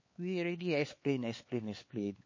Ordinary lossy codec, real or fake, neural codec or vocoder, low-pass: MP3, 32 kbps; fake; codec, 16 kHz, 4 kbps, X-Codec, HuBERT features, trained on LibriSpeech; 7.2 kHz